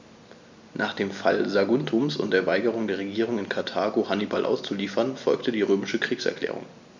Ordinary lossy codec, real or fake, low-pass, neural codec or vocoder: MP3, 64 kbps; real; 7.2 kHz; none